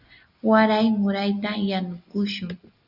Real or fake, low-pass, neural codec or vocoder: real; 5.4 kHz; none